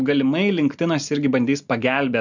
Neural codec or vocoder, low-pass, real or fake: none; 7.2 kHz; real